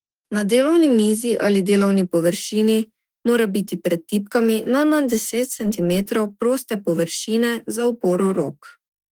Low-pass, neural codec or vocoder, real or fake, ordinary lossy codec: 19.8 kHz; autoencoder, 48 kHz, 32 numbers a frame, DAC-VAE, trained on Japanese speech; fake; Opus, 24 kbps